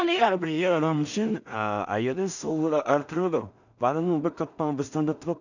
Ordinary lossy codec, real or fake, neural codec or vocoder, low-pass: none; fake; codec, 16 kHz in and 24 kHz out, 0.4 kbps, LongCat-Audio-Codec, two codebook decoder; 7.2 kHz